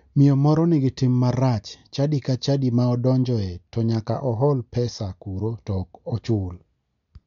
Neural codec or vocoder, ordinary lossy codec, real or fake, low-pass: none; MP3, 64 kbps; real; 7.2 kHz